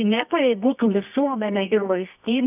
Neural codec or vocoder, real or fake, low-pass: codec, 24 kHz, 0.9 kbps, WavTokenizer, medium music audio release; fake; 3.6 kHz